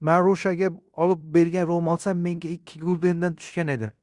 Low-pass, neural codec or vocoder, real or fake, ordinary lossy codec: 10.8 kHz; codec, 16 kHz in and 24 kHz out, 0.9 kbps, LongCat-Audio-Codec, fine tuned four codebook decoder; fake; Opus, 64 kbps